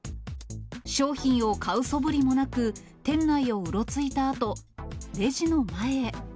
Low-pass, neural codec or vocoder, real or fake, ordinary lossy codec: none; none; real; none